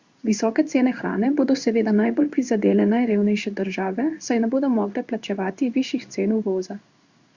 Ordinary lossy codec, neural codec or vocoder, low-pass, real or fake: Opus, 64 kbps; codec, 16 kHz in and 24 kHz out, 1 kbps, XY-Tokenizer; 7.2 kHz; fake